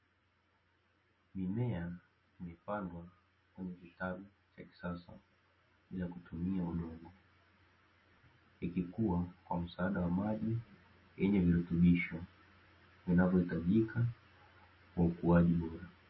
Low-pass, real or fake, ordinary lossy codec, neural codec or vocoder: 7.2 kHz; real; MP3, 24 kbps; none